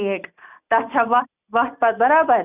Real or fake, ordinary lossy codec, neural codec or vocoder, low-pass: real; none; none; 3.6 kHz